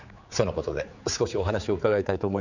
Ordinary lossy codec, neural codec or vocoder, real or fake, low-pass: none; codec, 16 kHz, 4 kbps, X-Codec, HuBERT features, trained on general audio; fake; 7.2 kHz